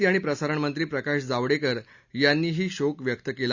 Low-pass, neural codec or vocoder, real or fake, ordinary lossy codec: 7.2 kHz; none; real; Opus, 64 kbps